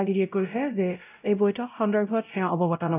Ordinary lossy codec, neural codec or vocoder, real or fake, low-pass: none; codec, 16 kHz, 0.5 kbps, X-Codec, WavLM features, trained on Multilingual LibriSpeech; fake; 3.6 kHz